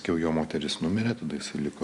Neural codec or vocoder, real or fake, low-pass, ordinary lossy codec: none; real; 10.8 kHz; MP3, 96 kbps